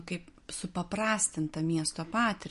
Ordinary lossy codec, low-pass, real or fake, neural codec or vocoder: MP3, 48 kbps; 10.8 kHz; real; none